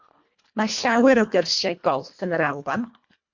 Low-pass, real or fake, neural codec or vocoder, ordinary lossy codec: 7.2 kHz; fake; codec, 24 kHz, 1.5 kbps, HILCodec; MP3, 48 kbps